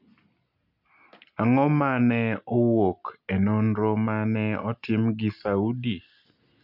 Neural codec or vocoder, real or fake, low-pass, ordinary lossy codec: none; real; 5.4 kHz; none